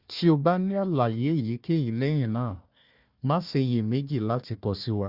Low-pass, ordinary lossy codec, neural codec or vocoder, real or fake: 5.4 kHz; Opus, 64 kbps; codec, 16 kHz, 1 kbps, FunCodec, trained on Chinese and English, 50 frames a second; fake